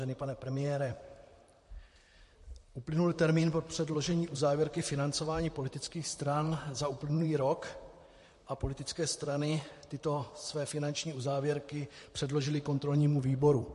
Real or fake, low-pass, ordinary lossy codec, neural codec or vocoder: fake; 14.4 kHz; MP3, 48 kbps; vocoder, 44.1 kHz, 128 mel bands, Pupu-Vocoder